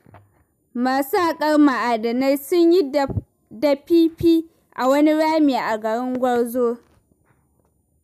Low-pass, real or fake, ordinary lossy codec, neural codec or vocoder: 14.4 kHz; real; none; none